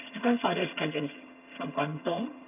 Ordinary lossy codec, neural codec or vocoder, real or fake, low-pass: none; vocoder, 22.05 kHz, 80 mel bands, HiFi-GAN; fake; 3.6 kHz